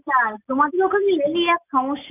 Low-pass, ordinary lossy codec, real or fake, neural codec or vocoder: 3.6 kHz; none; real; none